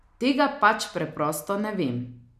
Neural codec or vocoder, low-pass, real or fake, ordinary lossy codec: none; 14.4 kHz; real; none